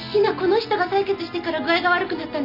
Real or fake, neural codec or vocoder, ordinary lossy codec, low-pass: real; none; none; 5.4 kHz